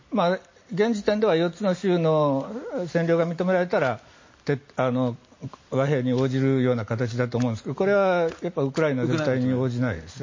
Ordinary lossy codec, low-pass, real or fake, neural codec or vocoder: MP3, 32 kbps; 7.2 kHz; real; none